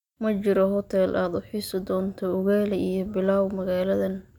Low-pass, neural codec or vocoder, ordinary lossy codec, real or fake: 19.8 kHz; none; none; real